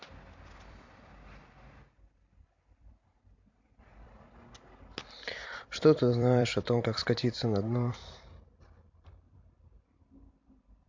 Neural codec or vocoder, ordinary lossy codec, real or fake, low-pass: vocoder, 44.1 kHz, 128 mel bands every 256 samples, BigVGAN v2; MP3, 48 kbps; fake; 7.2 kHz